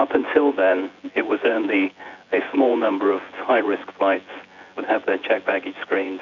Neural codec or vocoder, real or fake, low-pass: vocoder, 24 kHz, 100 mel bands, Vocos; fake; 7.2 kHz